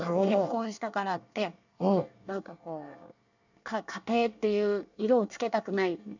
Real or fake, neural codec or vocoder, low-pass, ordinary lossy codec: fake; codec, 24 kHz, 1 kbps, SNAC; 7.2 kHz; none